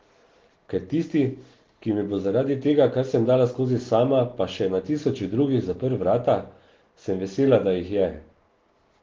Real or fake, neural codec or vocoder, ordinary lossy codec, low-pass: real; none; Opus, 16 kbps; 7.2 kHz